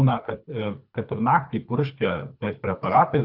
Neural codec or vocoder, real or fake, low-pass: codec, 24 kHz, 3 kbps, HILCodec; fake; 5.4 kHz